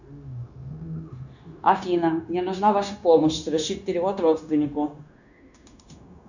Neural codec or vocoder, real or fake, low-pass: codec, 16 kHz, 0.9 kbps, LongCat-Audio-Codec; fake; 7.2 kHz